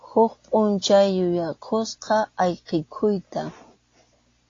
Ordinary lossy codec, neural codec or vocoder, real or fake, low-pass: AAC, 32 kbps; none; real; 7.2 kHz